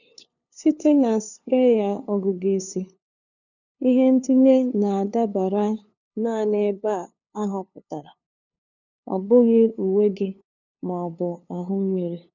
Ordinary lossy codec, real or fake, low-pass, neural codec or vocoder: none; fake; 7.2 kHz; codec, 16 kHz, 2 kbps, FunCodec, trained on LibriTTS, 25 frames a second